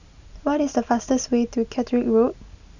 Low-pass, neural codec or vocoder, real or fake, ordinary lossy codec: 7.2 kHz; none; real; none